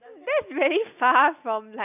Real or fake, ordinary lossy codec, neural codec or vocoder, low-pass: real; none; none; 3.6 kHz